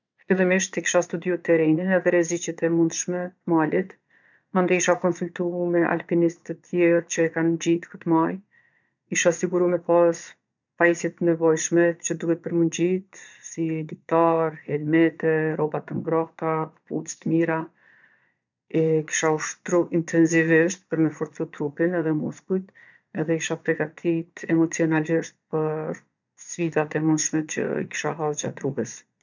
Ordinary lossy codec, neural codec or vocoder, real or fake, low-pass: none; vocoder, 22.05 kHz, 80 mel bands, Vocos; fake; 7.2 kHz